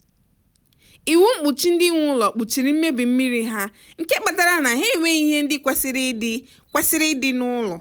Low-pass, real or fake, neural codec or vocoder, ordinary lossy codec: none; real; none; none